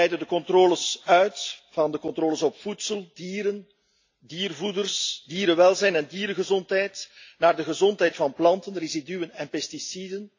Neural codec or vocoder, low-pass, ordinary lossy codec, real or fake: none; 7.2 kHz; AAC, 48 kbps; real